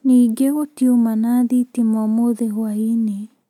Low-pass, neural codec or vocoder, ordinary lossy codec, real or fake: 19.8 kHz; none; none; real